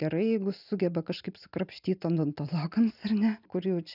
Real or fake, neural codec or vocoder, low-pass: real; none; 5.4 kHz